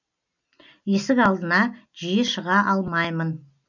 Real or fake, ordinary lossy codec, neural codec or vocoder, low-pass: real; none; none; 7.2 kHz